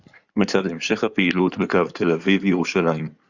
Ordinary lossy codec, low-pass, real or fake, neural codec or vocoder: Opus, 64 kbps; 7.2 kHz; fake; codec, 16 kHz in and 24 kHz out, 2.2 kbps, FireRedTTS-2 codec